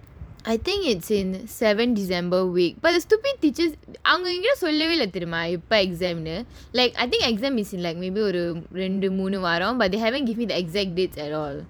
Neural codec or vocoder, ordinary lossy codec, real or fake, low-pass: vocoder, 44.1 kHz, 128 mel bands every 512 samples, BigVGAN v2; none; fake; none